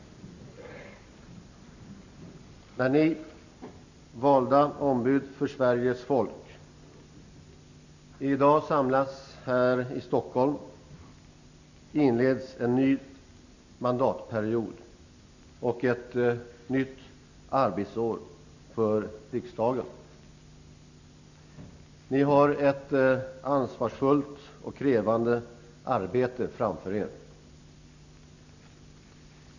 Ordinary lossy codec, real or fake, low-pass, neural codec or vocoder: none; real; 7.2 kHz; none